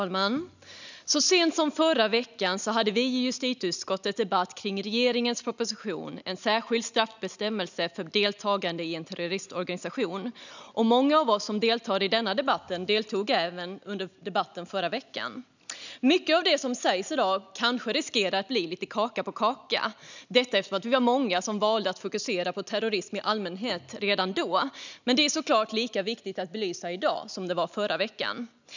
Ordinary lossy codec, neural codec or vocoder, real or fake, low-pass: none; none; real; 7.2 kHz